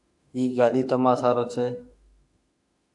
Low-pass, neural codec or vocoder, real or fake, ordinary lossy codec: 10.8 kHz; autoencoder, 48 kHz, 32 numbers a frame, DAC-VAE, trained on Japanese speech; fake; AAC, 64 kbps